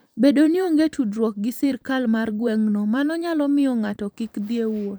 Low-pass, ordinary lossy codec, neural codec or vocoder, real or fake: none; none; vocoder, 44.1 kHz, 128 mel bands every 512 samples, BigVGAN v2; fake